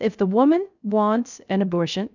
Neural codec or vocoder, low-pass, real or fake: codec, 16 kHz, 0.3 kbps, FocalCodec; 7.2 kHz; fake